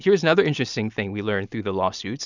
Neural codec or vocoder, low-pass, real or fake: none; 7.2 kHz; real